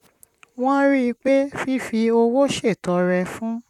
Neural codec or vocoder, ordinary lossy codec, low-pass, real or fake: vocoder, 44.1 kHz, 128 mel bands, Pupu-Vocoder; none; 19.8 kHz; fake